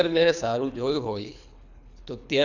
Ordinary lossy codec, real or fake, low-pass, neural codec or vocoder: none; fake; 7.2 kHz; codec, 24 kHz, 3 kbps, HILCodec